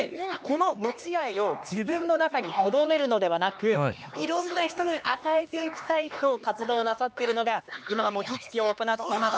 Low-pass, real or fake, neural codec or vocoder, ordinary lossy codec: none; fake; codec, 16 kHz, 2 kbps, X-Codec, HuBERT features, trained on LibriSpeech; none